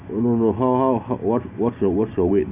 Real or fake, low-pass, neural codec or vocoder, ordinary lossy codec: real; 3.6 kHz; none; none